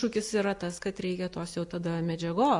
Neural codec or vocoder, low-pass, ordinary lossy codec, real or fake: none; 10.8 kHz; AAC, 64 kbps; real